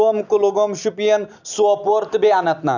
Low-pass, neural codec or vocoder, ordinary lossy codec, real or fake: 7.2 kHz; none; none; real